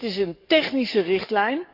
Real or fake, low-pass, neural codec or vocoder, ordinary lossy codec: fake; 5.4 kHz; codec, 44.1 kHz, 7.8 kbps, DAC; none